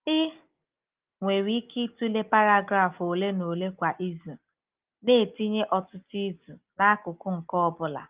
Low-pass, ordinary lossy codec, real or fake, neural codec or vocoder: 3.6 kHz; Opus, 32 kbps; real; none